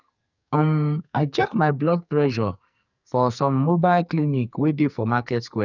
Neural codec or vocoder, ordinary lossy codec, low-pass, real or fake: codec, 44.1 kHz, 2.6 kbps, SNAC; none; 7.2 kHz; fake